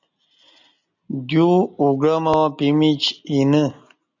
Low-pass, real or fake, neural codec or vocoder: 7.2 kHz; real; none